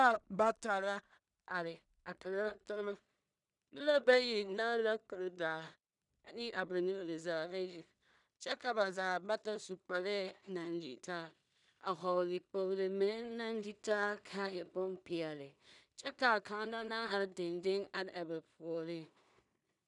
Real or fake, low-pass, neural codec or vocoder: fake; 10.8 kHz; codec, 16 kHz in and 24 kHz out, 0.4 kbps, LongCat-Audio-Codec, two codebook decoder